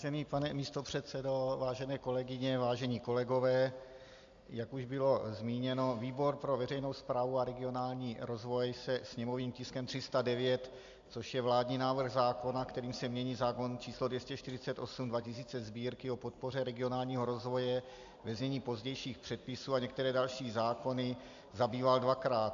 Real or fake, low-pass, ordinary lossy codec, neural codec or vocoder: real; 7.2 kHz; AAC, 64 kbps; none